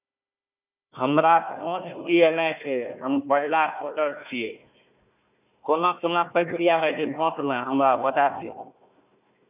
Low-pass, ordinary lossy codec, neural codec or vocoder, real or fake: 3.6 kHz; none; codec, 16 kHz, 1 kbps, FunCodec, trained on Chinese and English, 50 frames a second; fake